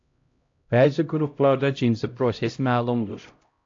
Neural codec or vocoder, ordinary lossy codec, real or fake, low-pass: codec, 16 kHz, 0.5 kbps, X-Codec, HuBERT features, trained on LibriSpeech; AAC, 48 kbps; fake; 7.2 kHz